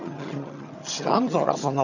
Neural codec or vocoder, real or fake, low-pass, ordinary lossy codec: vocoder, 22.05 kHz, 80 mel bands, HiFi-GAN; fake; 7.2 kHz; none